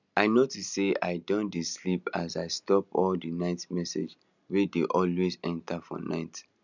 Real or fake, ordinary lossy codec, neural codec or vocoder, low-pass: real; none; none; 7.2 kHz